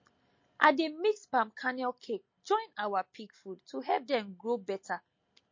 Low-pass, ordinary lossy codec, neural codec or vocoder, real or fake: 7.2 kHz; MP3, 32 kbps; none; real